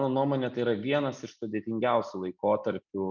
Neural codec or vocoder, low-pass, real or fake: none; 7.2 kHz; real